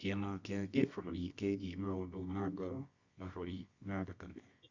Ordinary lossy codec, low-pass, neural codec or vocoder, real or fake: Opus, 64 kbps; 7.2 kHz; codec, 24 kHz, 0.9 kbps, WavTokenizer, medium music audio release; fake